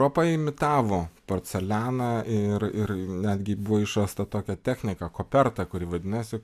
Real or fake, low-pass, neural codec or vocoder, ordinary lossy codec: real; 14.4 kHz; none; AAC, 96 kbps